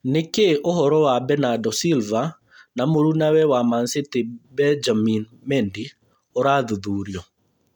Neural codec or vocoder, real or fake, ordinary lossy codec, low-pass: none; real; none; 19.8 kHz